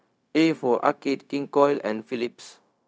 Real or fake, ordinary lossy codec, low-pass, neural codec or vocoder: fake; none; none; codec, 16 kHz, 0.4 kbps, LongCat-Audio-Codec